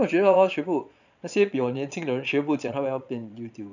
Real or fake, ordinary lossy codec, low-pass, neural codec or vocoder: fake; none; 7.2 kHz; vocoder, 22.05 kHz, 80 mel bands, Vocos